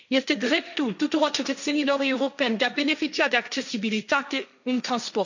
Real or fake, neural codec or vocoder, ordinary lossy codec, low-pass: fake; codec, 16 kHz, 1.1 kbps, Voila-Tokenizer; none; 7.2 kHz